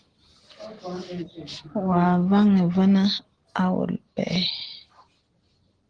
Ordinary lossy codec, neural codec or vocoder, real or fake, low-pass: Opus, 16 kbps; none; real; 9.9 kHz